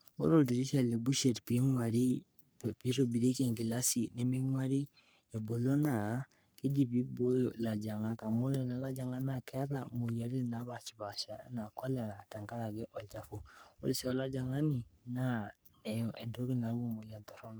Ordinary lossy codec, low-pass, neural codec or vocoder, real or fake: none; none; codec, 44.1 kHz, 3.4 kbps, Pupu-Codec; fake